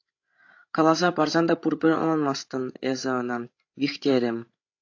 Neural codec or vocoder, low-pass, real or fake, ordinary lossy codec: codec, 16 kHz, 16 kbps, FreqCodec, larger model; 7.2 kHz; fake; AAC, 48 kbps